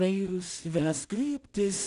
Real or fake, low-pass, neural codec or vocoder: fake; 10.8 kHz; codec, 16 kHz in and 24 kHz out, 0.4 kbps, LongCat-Audio-Codec, two codebook decoder